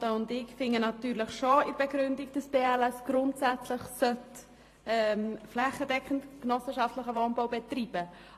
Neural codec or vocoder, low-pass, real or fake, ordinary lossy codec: vocoder, 44.1 kHz, 128 mel bands every 512 samples, BigVGAN v2; 14.4 kHz; fake; AAC, 48 kbps